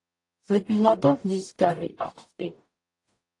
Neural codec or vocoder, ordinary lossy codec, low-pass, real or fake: codec, 44.1 kHz, 0.9 kbps, DAC; AAC, 48 kbps; 10.8 kHz; fake